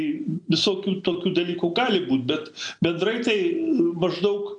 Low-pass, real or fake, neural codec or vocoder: 9.9 kHz; real; none